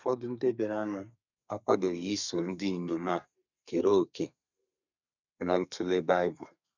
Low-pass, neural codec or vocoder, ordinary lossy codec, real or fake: 7.2 kHz; codec, 32 kHz, 1.9 kbps, SNAC; none; fake